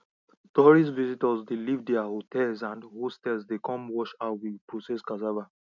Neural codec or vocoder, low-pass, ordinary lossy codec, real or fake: none; 7.2 kHz; none; real